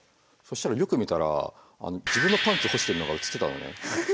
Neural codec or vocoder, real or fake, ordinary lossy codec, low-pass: none; real; none; none